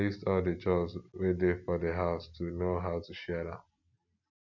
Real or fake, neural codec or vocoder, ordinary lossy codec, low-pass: real; none; none; 7.2 kHz